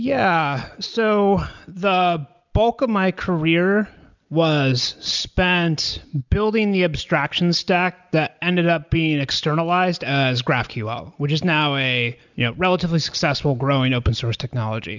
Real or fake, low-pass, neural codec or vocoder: real; 7.2 kHz; none